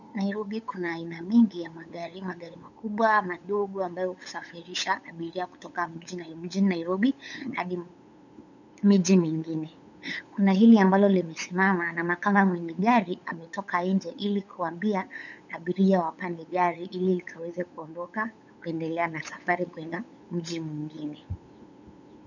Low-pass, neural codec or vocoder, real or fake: 7.2 kHz; codec, 16 kHz, 8 kbps, FunCodec, trained on LibriTTS, 25 frames a second; fake